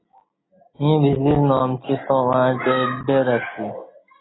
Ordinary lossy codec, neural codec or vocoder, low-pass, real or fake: AAC, 16 kbps; none; 7.2 kHz; real